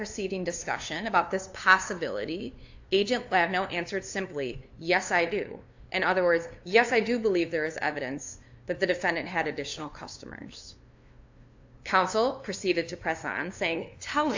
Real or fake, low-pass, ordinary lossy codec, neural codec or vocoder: fake; 7.2 kHz; AAC, 48 kbps; codec, 16 kHz, 2 kbps, FunCodec, trained on LibriTTS, 25 frames a second